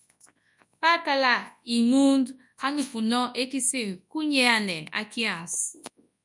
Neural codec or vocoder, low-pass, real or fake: codec, 24 kHz, 0.9 kbps, WavTokenizer, large speech release; 10.8 kHz; fake